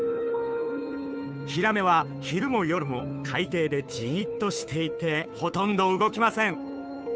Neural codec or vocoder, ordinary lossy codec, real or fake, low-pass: codec, 16 kHz, 2 kbps, FunCodec, trained on Chinese and English, 25 frames a second; none; fake; none